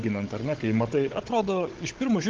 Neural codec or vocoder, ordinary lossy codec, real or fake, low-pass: codec, 16 kHz, 16 kbps, FunCodec, trained on Chinese and English, 50 frames a second; Opus, 16 kbps; fake; 7.2 kHz